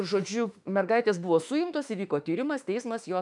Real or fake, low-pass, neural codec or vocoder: fake; 10.8 kHz; autoencoder, 48 kHz, 32 numbers a frame, DAC-VAE, trained on Japanese speech